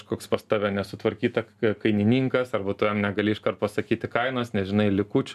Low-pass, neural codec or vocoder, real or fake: 14.4 kHz; none; real